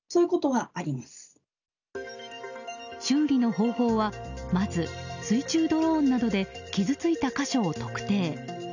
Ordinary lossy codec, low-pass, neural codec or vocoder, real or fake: none; 7.2 kHz; none; real